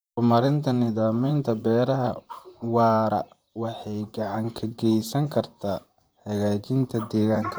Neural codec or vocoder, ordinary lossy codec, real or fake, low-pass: vocoder, 44.1 kHz, 128 mel bands, Pupu-Vocoder; none; fake; none